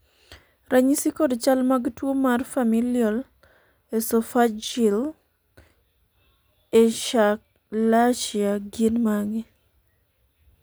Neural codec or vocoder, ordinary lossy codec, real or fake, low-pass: none; none; real; none